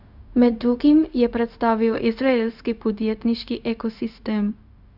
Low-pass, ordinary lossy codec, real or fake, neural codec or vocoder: 5.4 kHz; none; fake; codec, 16 kHz, 0.4 kbps, LongCat-Audio-Codec